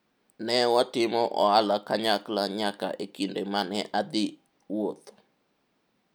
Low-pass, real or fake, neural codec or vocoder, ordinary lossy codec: none; real; none; none